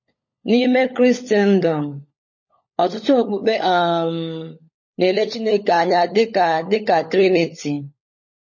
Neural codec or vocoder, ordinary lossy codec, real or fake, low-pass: codec, 16 kHz, 16 kbps, FunCodec, trained on LibriTTS, 50 frames a second; MP3, 32 kbps; fake; 7.2 kHz